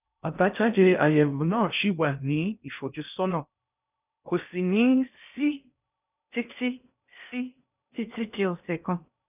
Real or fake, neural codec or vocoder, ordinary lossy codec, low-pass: fake; codec, 16 kHz in and 24 kHz out, 0.6 kbps, FocalCodec, streaming, 2048 codes; none; 3.6 kHz